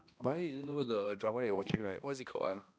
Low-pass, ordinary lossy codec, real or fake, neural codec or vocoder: none; none; fake; codec, 16 kHz, 1 kbps, X-Codec, HuBERT features, trained on balanced general audio